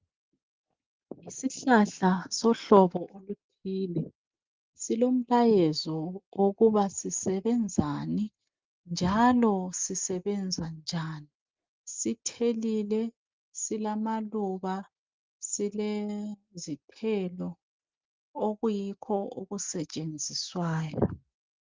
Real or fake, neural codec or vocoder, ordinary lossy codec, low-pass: real; none; Opus, 24 kbps; 7.2 kHz